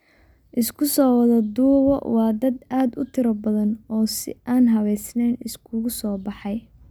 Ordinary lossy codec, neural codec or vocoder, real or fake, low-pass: none; none; real; none